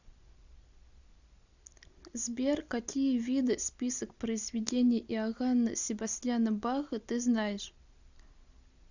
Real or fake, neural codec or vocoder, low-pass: real; none; 7.2 kHz